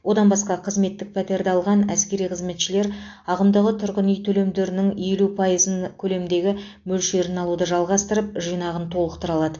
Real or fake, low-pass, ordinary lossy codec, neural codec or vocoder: real; 7.2 kHz; AAC, 48 kbps; none